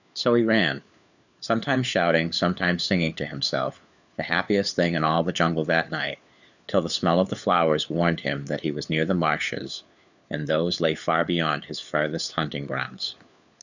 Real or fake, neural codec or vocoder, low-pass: fake; codec, 16 kHz, 4 kbps, FunCodec, trained on LibriTTS, 50 frames a second; 7.2 kHz